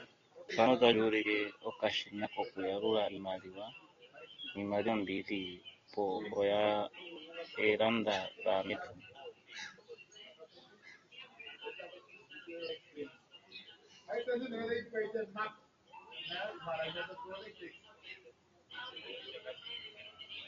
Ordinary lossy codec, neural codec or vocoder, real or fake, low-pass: AAC, 32 kbps; none; real; 7.2 kHz